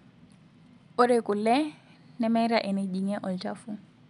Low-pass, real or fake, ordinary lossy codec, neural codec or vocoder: 10.8 kHz; real; none; none